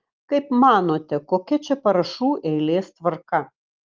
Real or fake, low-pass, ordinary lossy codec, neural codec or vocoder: real; 7.2 kHz; Opus, 32 kbps; none